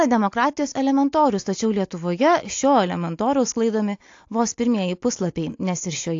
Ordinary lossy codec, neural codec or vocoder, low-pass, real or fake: AAC, 48 kbps; none; 7.2 kHz; real